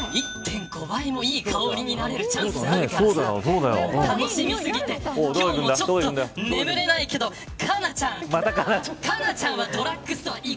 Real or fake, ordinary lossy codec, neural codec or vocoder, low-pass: real; none; none; none